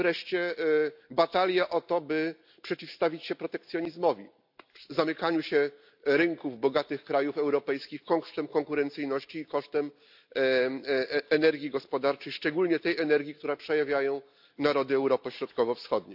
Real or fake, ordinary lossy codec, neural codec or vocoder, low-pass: real; AAC, 48 kbps; none; 5.4 kHz